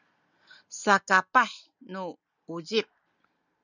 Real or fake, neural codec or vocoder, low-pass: real; none; 7.2 kHz